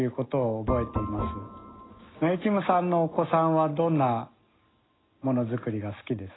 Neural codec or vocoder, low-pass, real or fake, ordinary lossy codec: none; 7.2 kHz; real; AAC, 16 kbps